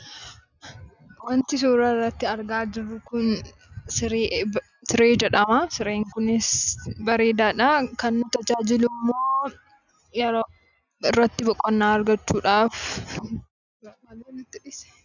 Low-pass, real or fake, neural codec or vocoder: 7.2 kHz; real; none